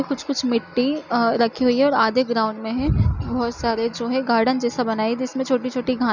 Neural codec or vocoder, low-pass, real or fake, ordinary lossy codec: none; 7.2 kHz; real; none